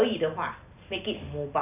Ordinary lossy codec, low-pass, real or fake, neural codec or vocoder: AAC, 32 kbps; 3.6 kHz; real; none